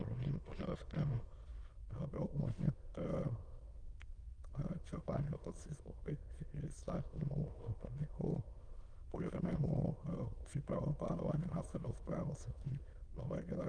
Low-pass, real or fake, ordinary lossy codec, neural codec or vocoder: 9.9 kHz; fake; Opus, 24 kbps; autoencoder, 22.05 kHz, a latent of 192 numbers a frame, VITS, trained on many speakers